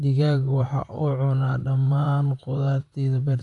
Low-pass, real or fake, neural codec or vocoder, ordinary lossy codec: 10.8 kHz; fake; vocoder, 44.1 kHz, 128 mel bands, Pupu-Vocoder; none